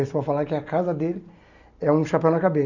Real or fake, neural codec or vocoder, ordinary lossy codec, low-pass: real; none; none; 7.2 kHz